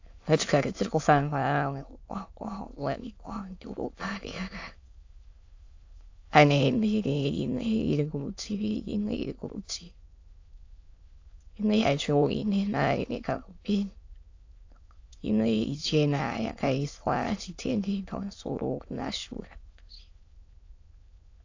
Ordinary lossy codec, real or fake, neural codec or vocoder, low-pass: AAC, 48 kbps; fake; autoencoder, 22.05 kHz, a latent of 192 numbers a frame, VITS, trained on many speakers; 7.2 kHz